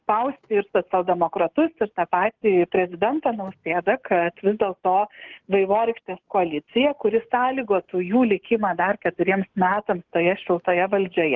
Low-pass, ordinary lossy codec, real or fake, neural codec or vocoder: 7.2 kHz; Opus, 32 kbps; real; none